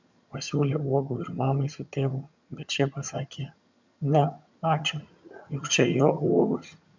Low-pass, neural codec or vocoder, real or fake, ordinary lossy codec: 7.2 kHz; vocoder, 22.05 kHz, 80 mel bands, HiFi-GAN; fake; MP3, 64 kbps